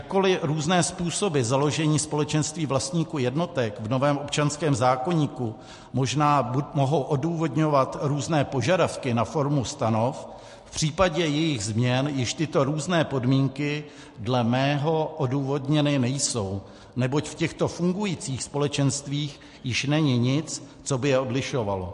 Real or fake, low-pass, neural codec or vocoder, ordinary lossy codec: real; 14.4 kHz; none; MP3, 48 kbps